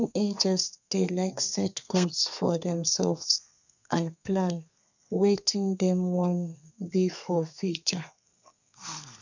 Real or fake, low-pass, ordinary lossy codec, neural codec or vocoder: fake; 7.2 kHz; none; codec, 32 kHz, 1.9 kbps, SNAC